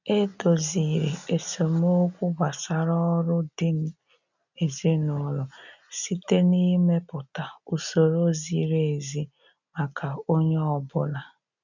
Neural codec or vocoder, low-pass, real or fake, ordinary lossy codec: none; 7.2 kHz; real; none